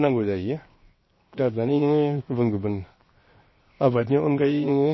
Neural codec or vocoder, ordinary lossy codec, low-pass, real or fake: codec, 16 kHz, 0.7 kbps, FocalCodec; MP3, 24 kbps; 7.2 kHz; fake